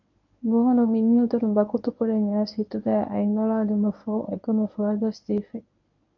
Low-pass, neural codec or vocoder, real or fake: 7.2 kHz; codec, 24 kHz, 0.9 kbps, WavTokenizer, medium speech release version 1; fake